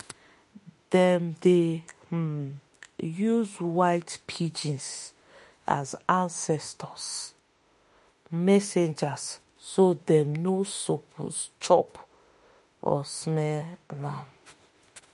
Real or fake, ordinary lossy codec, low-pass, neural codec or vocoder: fake; MP3, 48 kbps; 14.4 kHz; autoencoder, 48 kHz, 32 numbers a frame, DAC-VAE, trained on Japanese speech